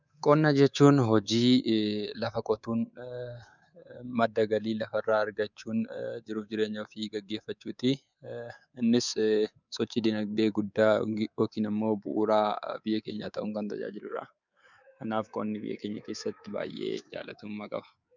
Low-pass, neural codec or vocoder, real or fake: 7.2 kHz; codec, 24 kHz, 3.1 kbps, DualCodec; fake